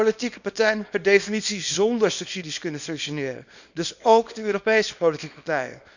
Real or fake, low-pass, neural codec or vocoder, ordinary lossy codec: fake; 7.2 kHz; codec, 24 kHz, 0.9 kbps, WavTokenizer, small release; none